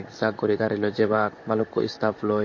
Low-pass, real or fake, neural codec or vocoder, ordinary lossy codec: 7.2 kHz; fake; vocoder, 44.1 kHz, 128 mel bands, Pupu-Vocoder; MP3, 32 kbps